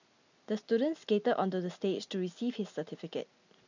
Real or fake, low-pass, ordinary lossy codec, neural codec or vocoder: real; 7.2 kHz; none; none